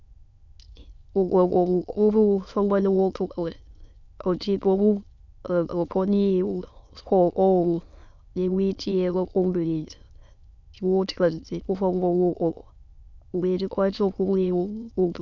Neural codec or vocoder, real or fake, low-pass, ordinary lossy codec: autoencoder, 22.05 kHz, a latent of 192 numbers a frame, VITS, trained on many speakers; fake; 7.2 kHz; Opus, 64 kbps